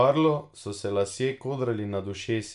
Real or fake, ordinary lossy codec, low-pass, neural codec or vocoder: real; none; 10.8 kHz; none